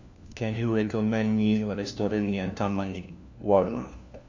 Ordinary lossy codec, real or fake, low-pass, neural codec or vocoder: AAC, 48 kbps; fake; 7.2 kHz; codec, 16 kHz, 1 kbps, FunCodec, trained on LibriTTS, 50 frames a second